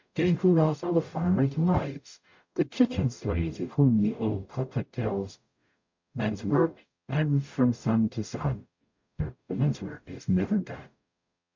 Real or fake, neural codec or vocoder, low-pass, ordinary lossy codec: fake; codec, 44.1 kHz, 0.9 kbps, DAC; 7.2 kHz; MP3, 64 kbps